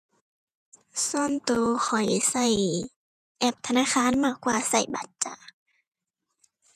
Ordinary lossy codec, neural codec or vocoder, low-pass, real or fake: none; vocoder, 48 kHz, 128 mel bands, Vocos; 14.4 kHz; fake